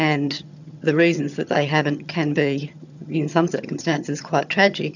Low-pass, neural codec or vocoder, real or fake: 7.2 kHz; vocoder, 22.05 kHz, 80 mel bands, HiFi-GAN; fake